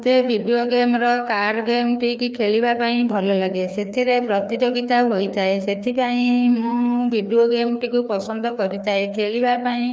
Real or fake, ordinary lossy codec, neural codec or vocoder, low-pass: fake; none; codec, 16 kHz, 2 kbps, FreqCodec, larger model; none